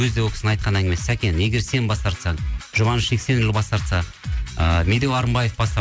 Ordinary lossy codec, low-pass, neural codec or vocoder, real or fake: none; none; none; real